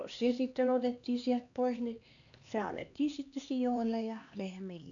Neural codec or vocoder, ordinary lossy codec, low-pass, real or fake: codec, 16 kHz, 2 kbps, X-Codec, HuBERT features, trained on LibriSpeech; none; 7.2 kHz; fake